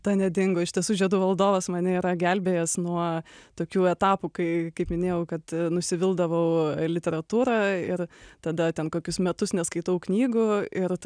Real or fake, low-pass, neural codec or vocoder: real; 9.9 kHz; none